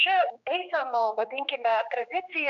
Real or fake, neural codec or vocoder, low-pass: fake; codec, 16 kHz, 2 kbps, X-Codec, HuBERT features, trained on general audio; 7.2 kHz